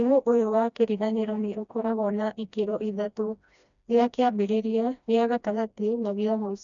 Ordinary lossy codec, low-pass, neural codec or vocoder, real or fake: none; 7.2 kHz; codec, 16 kHz, 1 kbps, FreqCodec, smaller model; fake